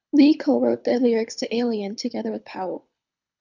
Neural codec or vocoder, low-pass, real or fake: codec, 24 kHz, 6 kbps, HILCodec; 7.2 kHz; fake